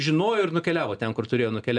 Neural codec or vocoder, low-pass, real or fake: none; 9.9 kHz; real